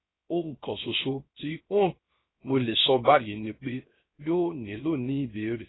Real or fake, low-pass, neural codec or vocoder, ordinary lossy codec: fake; 7.2 kHz; codec, 16 kHz, 0.3 kbps, FocalCodec; AAC, 16 kbps